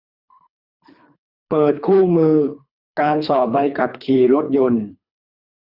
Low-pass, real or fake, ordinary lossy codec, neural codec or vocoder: 5.4 kHz; fake; none; codec, 24 kHz, 3 kbps, HILCodec